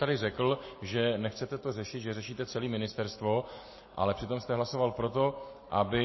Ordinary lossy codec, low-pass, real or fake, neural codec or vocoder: MP3, 24 kbps; 7.2 kHz; real; none